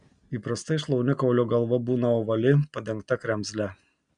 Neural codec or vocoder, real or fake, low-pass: none; real; 9.9 kHz